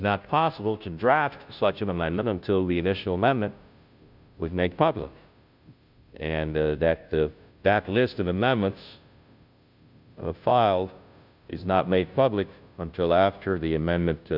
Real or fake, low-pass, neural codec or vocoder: fake; 5.4 kHz; codec, 16 kHz, 0.5 kbps, FunCodec, trained on Chinese and English, 25 frames a second